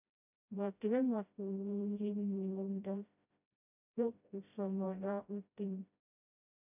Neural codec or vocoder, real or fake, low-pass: codec, 16 kHz, 0.5 kbps, FreqCodec, smaller model; fake; 3.6 kHz